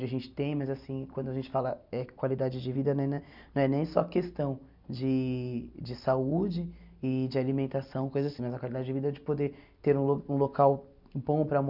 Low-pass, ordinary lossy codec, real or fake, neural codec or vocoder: 5.4 kHz; none; real; none